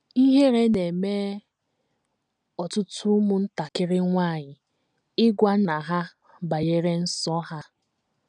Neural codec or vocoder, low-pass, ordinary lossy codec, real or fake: none; 9.9 kHz; none; real